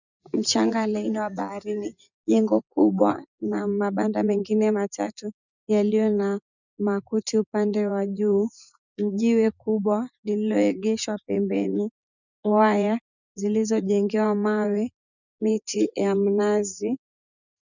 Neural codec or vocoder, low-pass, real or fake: vocoder, 24 kHz, 100 mel bands, Vocos; 7.2 kHz; fake